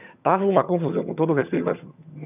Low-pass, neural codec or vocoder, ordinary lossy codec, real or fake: 3.6 kHz; vocoder, 22.05 kHz, 80 mel bands, HiFi-GAN; none; fake